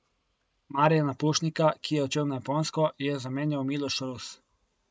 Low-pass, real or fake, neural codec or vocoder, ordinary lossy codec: none; real; none; none